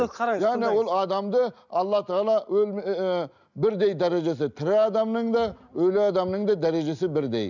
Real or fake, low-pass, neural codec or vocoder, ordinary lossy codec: real; 7.2 kHz; none; none